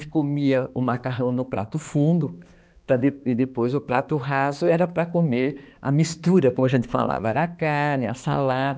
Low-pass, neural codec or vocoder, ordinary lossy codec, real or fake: none; codec, 16 kHz, 2 kbps, X-Codec, HuBERT features, trained on balanced general audio; none; fake